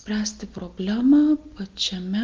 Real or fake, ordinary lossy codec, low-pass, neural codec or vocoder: real; Opus, 24 kbps; 7.2 kHz; none